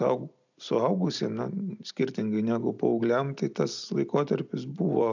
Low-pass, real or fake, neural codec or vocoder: 7.2 kHz; real; none